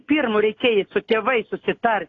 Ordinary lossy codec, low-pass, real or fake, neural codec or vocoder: AAC, 32 kbps; 7.2 kHz; real; none